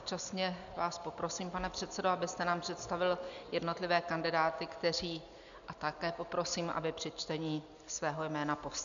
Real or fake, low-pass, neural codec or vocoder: real; 7.2 kHz; none